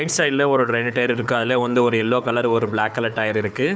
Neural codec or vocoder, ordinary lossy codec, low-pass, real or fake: codec, 16 kHz, 4 kbps, FunCodec, trained on Chinese and English, 50 frames a second; none; none; fake